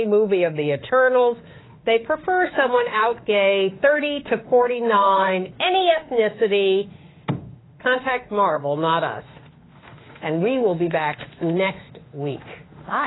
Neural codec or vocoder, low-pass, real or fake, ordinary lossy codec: autoencoder, 48 kHz, 32 numbers a frame, DAC-VAE, trained on Japanese speech; 7.2 kHz; fake; AAC, 16 kbps